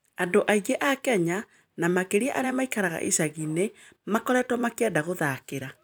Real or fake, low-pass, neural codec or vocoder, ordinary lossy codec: fake; none; vocoder, 44.1 kHz, 128 mel bands every 256 samples, BigVGAN v2; none